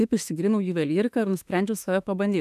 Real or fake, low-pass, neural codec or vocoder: fake; 14.4 kHz; autoencoder, 48 kHz, 32 numbers a frame, DAC-VAE, trained on Japanese speech